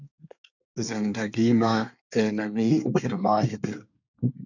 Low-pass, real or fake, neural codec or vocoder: 7.2 kHz; fake; codec, 24 kHz, 1 kbps, SNAC